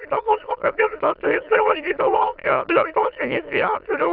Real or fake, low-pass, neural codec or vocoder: fake; 5.4 kHz; autoencoder, 22.05 kHz, a latent of 192 numbers a frame, VITS, trained on many speakers